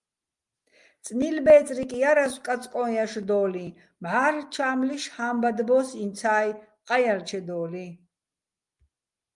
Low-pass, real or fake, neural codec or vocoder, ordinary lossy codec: 10.8 kHz; real; none; Opus, 32 kbps